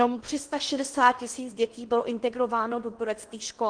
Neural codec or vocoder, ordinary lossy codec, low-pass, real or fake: codec, 16 kHz in and 24 kHz out, 0.8 kbps, FocalCodec, streaming, 65536 codes; Opus, 24 kbps; 9.9 kHz; fake